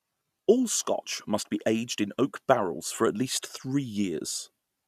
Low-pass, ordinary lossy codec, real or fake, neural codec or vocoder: 14.4 kHz; none; real; none